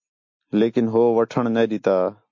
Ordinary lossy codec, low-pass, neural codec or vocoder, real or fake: MP3, 48 kbps; 7.2 kHz; none; real